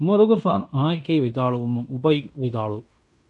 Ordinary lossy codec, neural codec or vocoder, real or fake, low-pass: AAC, 64 kbps; codec, 16 kHz in and 24 kHz out, 0.9 kbps, LongCat-Audio-Codec, fine tuned four codebook decoder; fake; 10.8 kHz